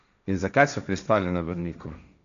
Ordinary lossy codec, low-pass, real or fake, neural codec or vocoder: none; 7.2 kHz; fake; codec, 16 kHz, 1.1 kbps, Voila-Tokenizer